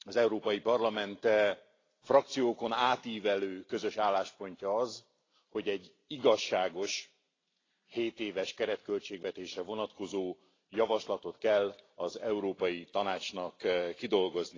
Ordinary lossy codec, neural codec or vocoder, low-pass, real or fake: AAC, 32 kbps; none; 7.2 kHz; real